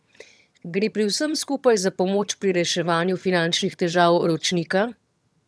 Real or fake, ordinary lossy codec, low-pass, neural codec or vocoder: fake; none; none; vocoder, 22.05 kHz, 80 mel bands, HiFi-GAN